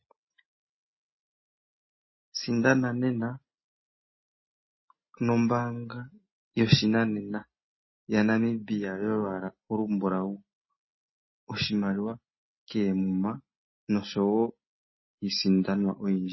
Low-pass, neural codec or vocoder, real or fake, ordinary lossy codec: 7.2 kHz; none; real; MP3, 24 kbps